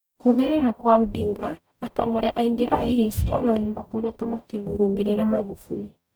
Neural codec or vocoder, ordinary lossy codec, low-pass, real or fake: codec, 44.1 kHz, 0.9 kbps, DAC; none; none; fake